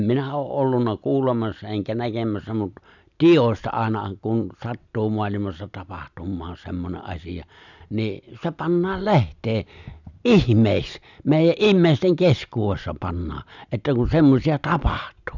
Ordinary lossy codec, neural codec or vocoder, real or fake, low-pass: none; none; real; 7.2 kHz